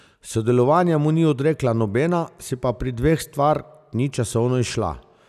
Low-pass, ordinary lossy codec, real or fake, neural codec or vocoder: 14.4 kHz; none; real; none